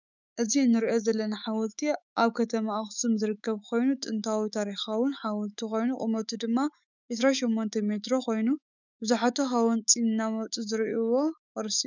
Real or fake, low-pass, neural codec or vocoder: fake; 7.2 kHz; autoencoder, 48 kHz, 128 numbers a frame, DAC-VAE, trained on Japanese speech